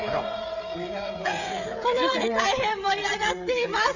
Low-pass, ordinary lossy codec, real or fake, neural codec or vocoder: 7.2 kHz; none; fake; codec, 16 kHz, 16 kbps, FreqCodec, smaller model